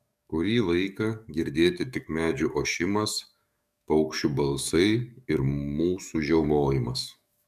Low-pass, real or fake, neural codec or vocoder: 14.4 kHz; fake; codec, 44.1 kHz, 7.8 kbps, DAC